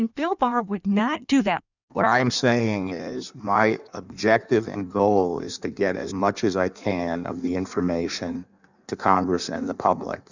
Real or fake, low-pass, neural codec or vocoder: fake; 7.2 kHz; codec, 16 kHz in and 24 kHz out, 1.1 kbps, FireRedTTS-2 codec